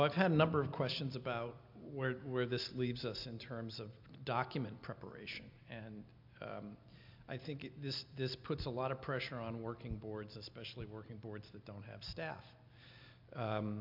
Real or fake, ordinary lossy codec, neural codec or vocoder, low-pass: real; MP3, 48 kbps; none; 5.4 kHz